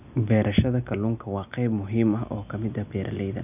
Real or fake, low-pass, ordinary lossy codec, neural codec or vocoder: real; 3.6 kHz; none; none